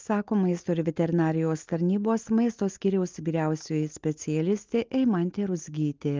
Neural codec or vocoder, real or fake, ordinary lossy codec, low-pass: none; real; Opus, 32 kbps; 7.2 kHz